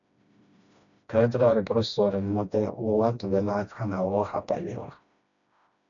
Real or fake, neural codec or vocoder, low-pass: fake; codec, 16 kHz, 1 kbps, FreqCodec, smaller model; 7.2 kHz